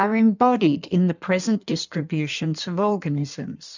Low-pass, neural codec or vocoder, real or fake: 7.2 kHz; codec, 16 kHz in and 24 kHz out, 1.1 kbps, FireRedTTS-2 codec; fake